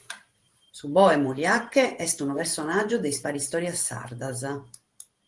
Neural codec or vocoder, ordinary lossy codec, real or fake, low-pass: vocoder, 44.1 kHz, 128 mel bands every 512 samples, BigVGAN v2; Opus, 24 kbps; fake; 10.8 kHz